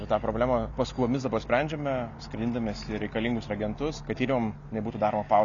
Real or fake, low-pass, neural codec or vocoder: real; 7.2 kHz; none